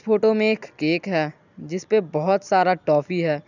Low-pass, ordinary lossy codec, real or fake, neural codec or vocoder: 7.2 kHz; none; real; none